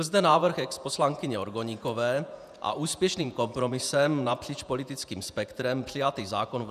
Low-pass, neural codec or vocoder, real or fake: 14.4 kHz; vocoder, 48 kHz, 128 mel bands, Vocos; fake